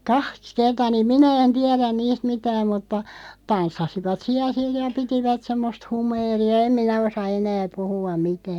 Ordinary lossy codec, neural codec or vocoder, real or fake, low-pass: none; none; real; 19.8 kHz